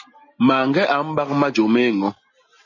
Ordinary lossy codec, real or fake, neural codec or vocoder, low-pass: MP3, 32 kbps; real; none; 7.2 kHz